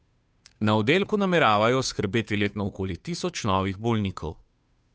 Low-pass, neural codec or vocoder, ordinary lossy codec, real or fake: none; codec, 16 kHz, 2 kbps, FunCodec, trained on Chinese and English, 25 frames a second; none; fake